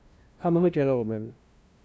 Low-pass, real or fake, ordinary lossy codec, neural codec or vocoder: none; fake; none; codec, 16 kHz, 0.5 kbps, FunCodec, trained on LibriTTS, 25 frames a second